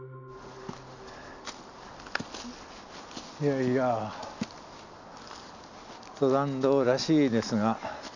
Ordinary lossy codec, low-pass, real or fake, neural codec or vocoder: none; 7.2 kHz; real; none